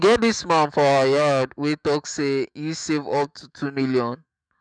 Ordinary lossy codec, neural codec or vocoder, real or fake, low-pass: none; autoencoder, 48 kHz, 128 numbers a frame, DAC-VAE, trained on Japanese speech; fake; 9.9 kHz